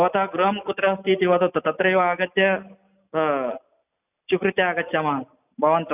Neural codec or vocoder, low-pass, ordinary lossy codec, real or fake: none; 3.6 kHz; none; real